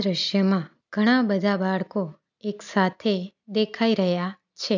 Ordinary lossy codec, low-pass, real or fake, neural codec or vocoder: none; 7.2 kHz; real; none